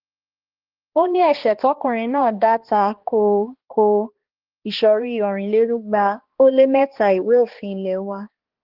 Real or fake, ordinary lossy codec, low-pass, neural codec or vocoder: fake; Opus, 16 kbps; 5.4 kHz; codec, 16 kHz, 2 kbps, X-Codec, HuBERT features, trained on balanced general audio